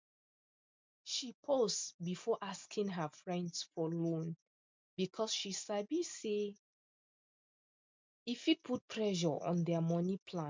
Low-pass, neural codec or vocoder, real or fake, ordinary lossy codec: 7.2 kHz; none; real; MP3, 64 kbps